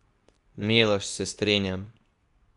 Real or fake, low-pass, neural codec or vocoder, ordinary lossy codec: fake; 10.8 kHz; codec, 24 kHz, 0.9 kbps, WavTokenizer, medium speech release version 2; none